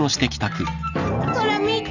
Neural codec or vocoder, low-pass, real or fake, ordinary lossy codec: none; 7.2 kHz; real; none